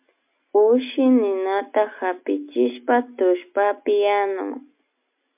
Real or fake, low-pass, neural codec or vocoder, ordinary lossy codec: real; 3.6 kHz; none; MP3, 32 kbps